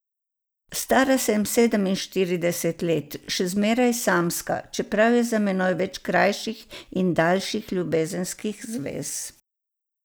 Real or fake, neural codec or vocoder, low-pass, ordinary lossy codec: real; none; none; none